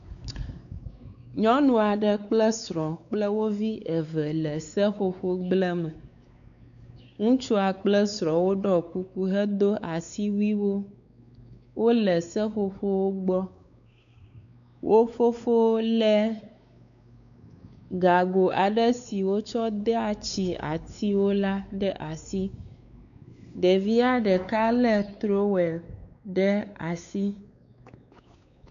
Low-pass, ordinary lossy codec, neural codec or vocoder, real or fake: 7.2 kHz; MP3, 96 kbps; codec, 16 kHz, 4 kbps, X-Codec, WavLM features, trained on Multilingual LibriSpeech; fake